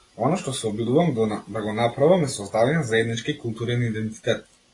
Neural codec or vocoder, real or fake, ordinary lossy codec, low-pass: none; real; AAC, 32 kbps; 10.8 kHz